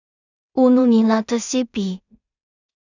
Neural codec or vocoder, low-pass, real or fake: codec, 16 kHz in and 24 kHz out, 0.4 kbps, LongCat-Audio-Codec, two codebook decoder; 7.2 kHz; fake